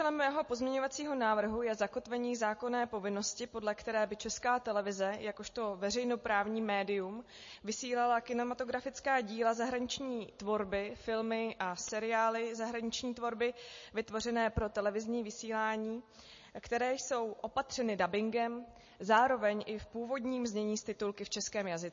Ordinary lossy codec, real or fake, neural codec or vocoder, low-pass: MP3, 32 kbps; real; none; 7.2 kHz